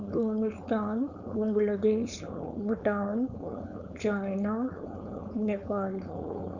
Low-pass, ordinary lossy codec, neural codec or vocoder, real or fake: 7.2 kHz; none; codec, 16 kHz, 4.8 kbps, FACodec; fake